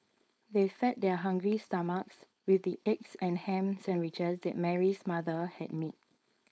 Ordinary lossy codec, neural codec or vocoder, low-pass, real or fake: none; codec, 16 kHz, 4.8 kbps, FACodec; none; fake